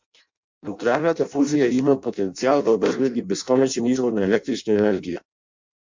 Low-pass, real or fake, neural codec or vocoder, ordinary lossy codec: 7.2 kHz; fake; codec, 16 kHz in and 24 kHz out, 0.6 kbps, FireRedTTS-2 codec; MP3, 64 kbps